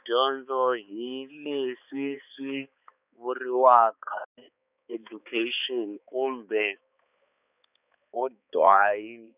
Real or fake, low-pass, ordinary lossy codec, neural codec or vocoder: fake; 3.6 kHz; none; codec, 16 kHz, 4 kbps, X-Codec, HuBERT features, trained on balanced general audio